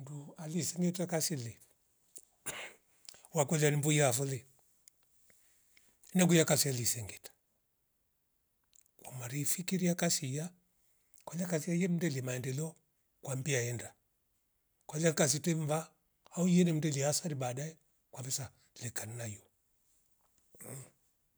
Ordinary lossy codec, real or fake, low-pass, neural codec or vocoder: none; real; none; none